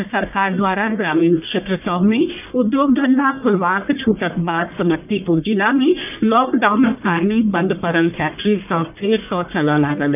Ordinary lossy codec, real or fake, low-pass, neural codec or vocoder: none; fake; 3.6 kHz; codec, 44.1 kHz, 1.7 kbps, Pupu-Codec